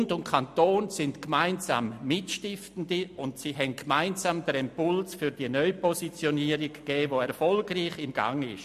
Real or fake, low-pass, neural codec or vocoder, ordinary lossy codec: real; 14.4 kHz; none; MP3, 64 kbps